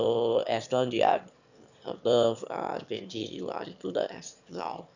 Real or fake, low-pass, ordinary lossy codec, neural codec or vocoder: fake; 7.2 kHz; none; autoencoder, 22.05 kHz, a latent of 192 numbers a frame, VITS, trained on one speaker